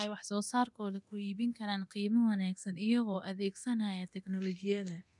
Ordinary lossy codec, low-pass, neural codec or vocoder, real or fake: none; 10.8 kHz; codec, 24 kHz, 0.9 kbps, DualCodec; fake